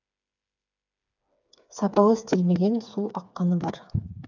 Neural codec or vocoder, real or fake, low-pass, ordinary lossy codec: codec, 16 kHz, 4 kbps, FreqCodec, smaller model; fake; 7.2 kHz; none